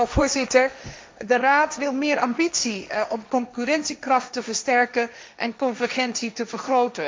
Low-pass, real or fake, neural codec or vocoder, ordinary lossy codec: none; fake; codec, 16 kHz, 1.1 kbps, Voila-Tokenizer; none